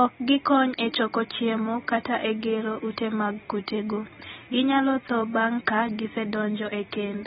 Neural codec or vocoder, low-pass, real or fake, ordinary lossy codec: none; 19.8 kHz; real; AAC, 16 kbps